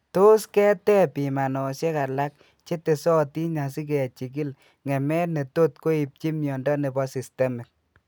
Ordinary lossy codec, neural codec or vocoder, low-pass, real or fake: none; none; none; real